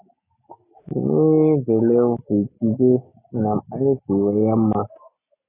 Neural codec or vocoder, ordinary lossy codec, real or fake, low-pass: none; AAC, 16 kbps; real; 3.6 kHz